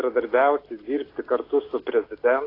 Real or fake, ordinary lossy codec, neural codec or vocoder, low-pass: real; AAC, 24 kbps; none; 5.4 kHz